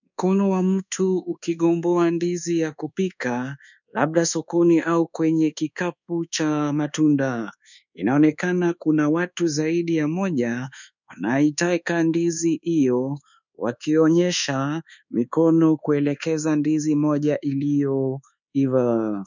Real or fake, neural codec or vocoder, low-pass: fake; codec, 24 kHz, 1.2 kbps, DualCodec; 7.2 kHz